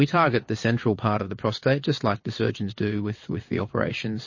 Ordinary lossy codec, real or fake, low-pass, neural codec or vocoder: MP3, 32 kbps; fake; 7.2 kHz; vocoder, 22.05 kHz, 80 mel bands, WaveNeXt